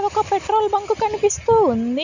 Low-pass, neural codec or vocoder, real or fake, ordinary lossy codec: 7.2 kHz; none; real; none